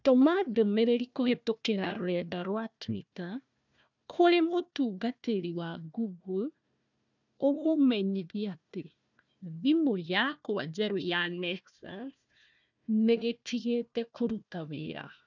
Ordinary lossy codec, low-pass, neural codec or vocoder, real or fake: none; 7.2 kHz; codec, 16 kHz, 1 kbps, FunCodec, trained on Chinese and English, 50 frames a second; fake